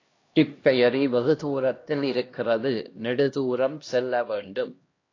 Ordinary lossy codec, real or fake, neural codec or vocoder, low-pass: AAC, 32 kbps; fake; codec, 16 kHz, 1 kbps, X-Codec, HuBERT features, trained on LibriSpeech; 7.2 kHz